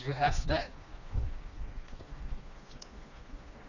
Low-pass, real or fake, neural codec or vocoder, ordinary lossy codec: 7.2 kHz; fake; codec, 16 kHz, 2 kbps, FreqCodec, smaller model; none